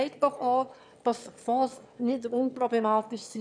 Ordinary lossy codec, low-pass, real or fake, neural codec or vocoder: none; 9.9 kHz; fake; autoencoder, 22.05 kHz, a latent of 192 numbers a frame, VITS, trained on one speaker